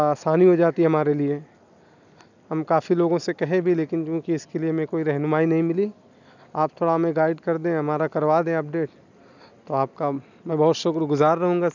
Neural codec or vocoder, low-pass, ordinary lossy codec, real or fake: none; 7.2 kHz; none; real